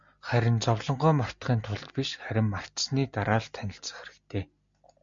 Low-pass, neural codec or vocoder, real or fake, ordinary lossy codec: 7.2 kHz; none; real; MP3, 64 kbps